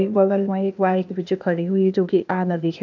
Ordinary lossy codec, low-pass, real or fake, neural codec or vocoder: none; 7.2 kHz; fake; codec, 16 kHz, 0.8 kbps, ZipCodec